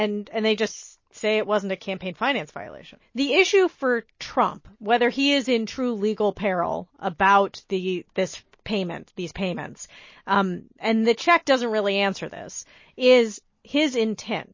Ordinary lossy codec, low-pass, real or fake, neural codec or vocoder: MP3, 32 kbps; 7.2 kHz; real; none